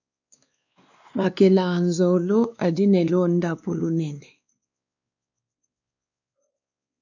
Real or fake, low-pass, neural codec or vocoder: fake; 7.2 kHz; codec, 16 kHz, 2 kbps, X-Codec, WavLM features, trained on Multilingual LibriSpeech